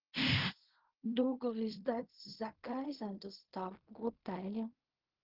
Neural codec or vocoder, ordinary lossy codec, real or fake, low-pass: codec, 16 kHz in and 24 kHz out, 0.4 kbps, LongCat-Audio-Codec, fine tuned four codebook decoder; Opus, 16 kbps; fake; 5.4 kHz